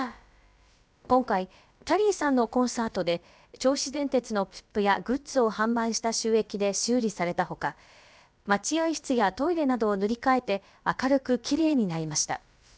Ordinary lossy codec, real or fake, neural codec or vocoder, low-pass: none; fake; codec, 16 kHz, about 1 kbps, DyCAST, with the encoder's durations; none